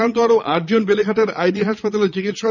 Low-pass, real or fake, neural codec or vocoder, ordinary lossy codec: 7.2 kHz; fake; vocoder, 22.05 kHz, 80 mel bands, Vocos; none